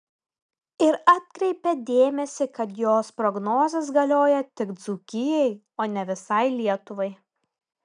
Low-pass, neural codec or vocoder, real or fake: 9.9 kHz; none; real